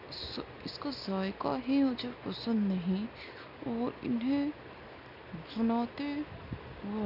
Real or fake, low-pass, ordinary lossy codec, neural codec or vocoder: real; 5.4 kHz; none; none